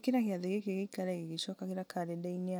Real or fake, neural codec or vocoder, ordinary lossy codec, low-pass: real; none; none; none